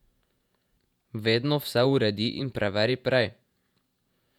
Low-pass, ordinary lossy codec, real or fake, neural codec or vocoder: 19.8 kHz; none; fake; vocoder, 48 kHz, 128 mel bands, Vocos